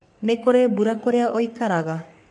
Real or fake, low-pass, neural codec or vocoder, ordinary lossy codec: fake; 10.8 kHz; codec, 44.1 kHz, 3.4 kbps, Pupu-Codec; MP3, 64 kbps